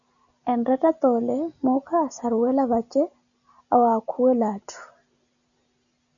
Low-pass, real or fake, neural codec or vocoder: 7.2 kHz; real; none